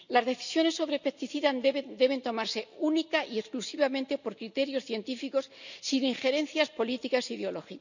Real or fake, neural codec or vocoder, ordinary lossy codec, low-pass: real; none; none; 7.2 kHz